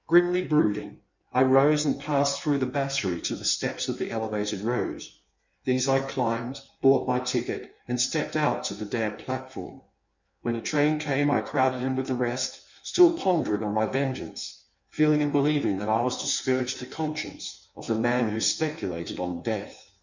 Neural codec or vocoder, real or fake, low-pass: codec, 16 kHz in and 24 kHz out, 1.1 kbps, FireRedTTS-2 codec; fake; 7.2 kHz